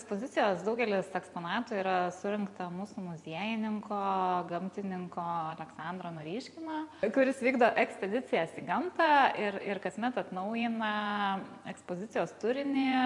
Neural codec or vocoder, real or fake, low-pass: vocoder, 24 kHz, 100 mel bands, Vocos; fake; 10.8 kHz